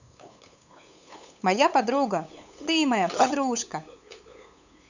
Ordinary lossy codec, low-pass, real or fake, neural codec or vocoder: none; 7.2 kHz; fake; codec, 16 kHz, 8 kbps, FunCodec, trained on LibriTTS, 25 frames a second